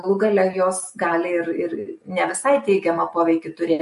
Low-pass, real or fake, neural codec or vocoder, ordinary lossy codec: 14.4 kHz; real; none; MP3, 48 kbps